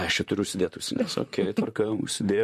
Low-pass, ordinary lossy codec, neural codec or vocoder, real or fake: 14.4 kHz; MP3, 64 kbps; vocoder, 44.1 kHz, 128 mel bands, Pupu-Vocoder; fake